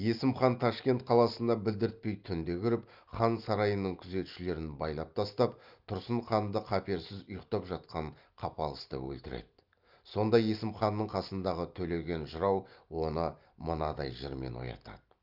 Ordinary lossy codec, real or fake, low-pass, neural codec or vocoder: Opus, 24 kbps; real; 5.4 kHz; none